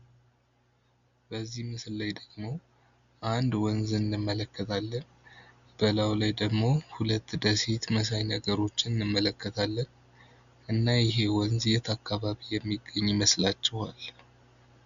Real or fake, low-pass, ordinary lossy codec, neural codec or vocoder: real; 7.2 kHz; Opus, 64 kbps; none